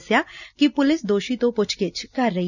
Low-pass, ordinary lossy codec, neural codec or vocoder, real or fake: 7.2 kHz; none; none; real